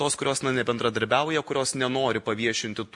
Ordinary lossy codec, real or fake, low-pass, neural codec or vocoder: MP3, 48 kbps; real; 10.8 kHz; none